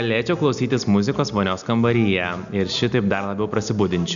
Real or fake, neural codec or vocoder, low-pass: real; none; 7.2 kHz